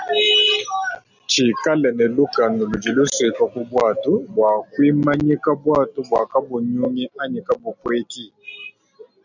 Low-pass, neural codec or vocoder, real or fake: 7.2 kHz; none; real